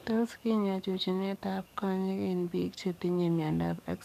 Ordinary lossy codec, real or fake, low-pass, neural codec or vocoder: none; fake; 14.4 kHz; codec, 44.1 kHz, 7.8 kbps, DAC